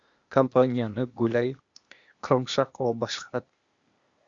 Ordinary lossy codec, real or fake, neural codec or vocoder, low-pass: Opus, 64 kbps; fake; codec, 16 kHz, 0.8 kbps, ZipCodec; 7.2 kHz